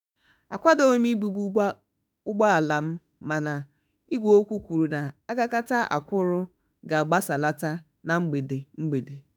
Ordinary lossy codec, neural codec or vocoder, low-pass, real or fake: none; autoencoder, 48 kHz, 32 numbers a frame, DAC-VAE, trained on Japanese speech; none; fake